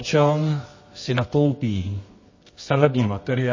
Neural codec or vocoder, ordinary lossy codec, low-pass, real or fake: codec, 24 kHz, 0.9 kbps, WavTokenizer, medium music audio release; MP3, 32 kbps; 7.2 kHz; fake